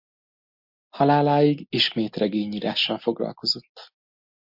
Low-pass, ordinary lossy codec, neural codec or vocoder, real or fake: 5.4 kHz; MP3, 48 kbps; none; real